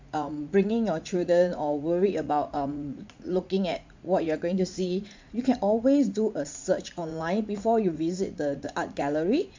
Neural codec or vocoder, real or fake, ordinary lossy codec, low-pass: vocoder, 44.1 kHz, 80 mel bands, Vocos; fake; MP3, 64 kbps; 7.2 kHz